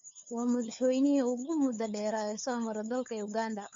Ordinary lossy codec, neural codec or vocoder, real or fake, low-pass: MP3, 48 kbps; codec, 16 kHz, 4 kbps, FunCodec, trained on LibriTTS, 50 frames a second; fake; 7.2 kHz